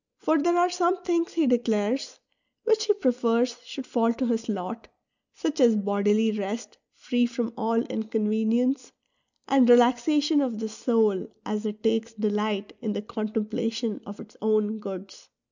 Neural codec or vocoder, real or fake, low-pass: none; real; 7.2 kHz